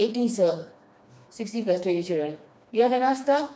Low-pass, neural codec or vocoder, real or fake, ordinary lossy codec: none; codec, 16 kHz, 2 kbps, FreqCodec, smaller model; fake; none